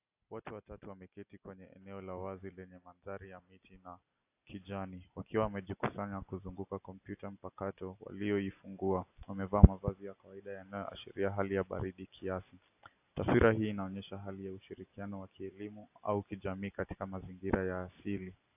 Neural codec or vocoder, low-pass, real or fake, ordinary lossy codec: none; 3.6 kHz; real; AAC, 32 kbps